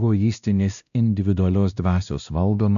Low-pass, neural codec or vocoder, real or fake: 7.2 kHz; codec, 16 kHz, 1 kbps, X-Codec, WavLM features, trained on Multilingual LibriSpeech; fake